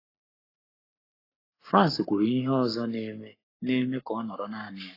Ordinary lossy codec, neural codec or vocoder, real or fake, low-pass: AAC, 24 kbps; codec, 44.1 kHz, 7.8 kbps, Pupu-Codec; fake; 5.4 kHz